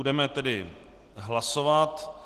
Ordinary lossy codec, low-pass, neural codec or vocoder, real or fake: Opus, 16 kbps; 14.4 kHz; none; real